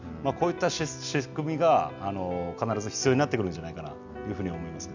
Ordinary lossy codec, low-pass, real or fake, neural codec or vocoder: none; 7.2 kHz; real; none